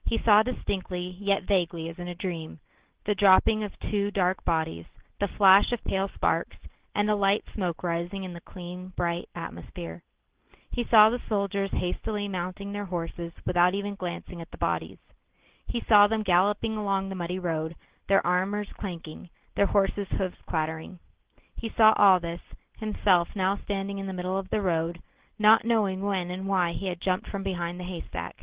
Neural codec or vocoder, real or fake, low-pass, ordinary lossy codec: none; real; 3.6 kHz; Opus, 16 kbps